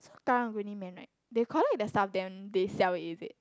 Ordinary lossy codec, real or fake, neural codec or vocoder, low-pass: none; real; none; none